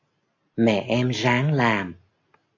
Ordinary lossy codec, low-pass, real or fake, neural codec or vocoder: AAC, 48 kbps; 7.2 kHz; real; none